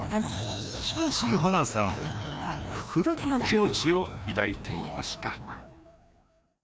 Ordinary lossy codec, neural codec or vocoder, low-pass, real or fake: none; codec, 16 kHz, 1 kbps, FreqCodec, larger model; none; fake